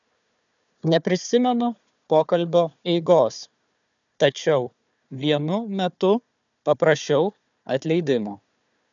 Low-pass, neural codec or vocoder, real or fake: 7.2 kHz; codec, 16 kHz, 4 kbps, FunCodec, trained on Chinese and English, 50 frames a second; fake